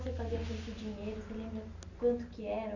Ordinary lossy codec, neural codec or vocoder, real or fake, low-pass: none; none; real; 7.2 kHz